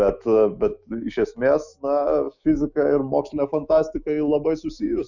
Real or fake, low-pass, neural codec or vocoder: fake; 7.2 kHz; autoencoder, 48 kHz, 128 numbers a frame, DAC-VAE, trained on Japanese speech